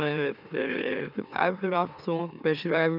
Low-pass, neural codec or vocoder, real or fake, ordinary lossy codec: 5.4 kHz; autoencoder, 44.1 kHz, a latent of 192 numbers a frame, MeloTTS; fake; none